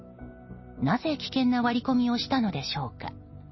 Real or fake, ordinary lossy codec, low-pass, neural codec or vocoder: real; MP3, 24 kbps; 7.2 kHz; none